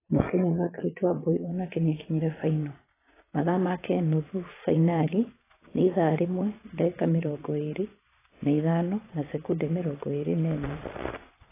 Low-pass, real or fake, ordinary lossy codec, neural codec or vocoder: 3.6 kHz; fake; AAC, 16 kbps; vocoder, 22.05 kHz, 80 mel bands, Vocos